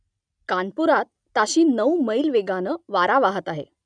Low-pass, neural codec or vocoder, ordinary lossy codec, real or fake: 9.9 kHz; none; none; real